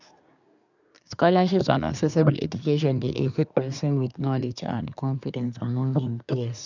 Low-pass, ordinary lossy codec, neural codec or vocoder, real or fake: 7.2 kHz; none; codec, 24 kHz, 1 kbps, SNAC; fake